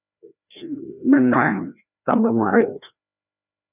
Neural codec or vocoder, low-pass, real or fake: codec, 16 kHz, 1 kbps, FreqCodec, larger model; 3.6 kHz; fake